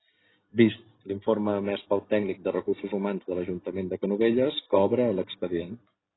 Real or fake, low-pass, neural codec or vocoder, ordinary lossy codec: real; 7.2 kHz; none; AAC, 16 kbps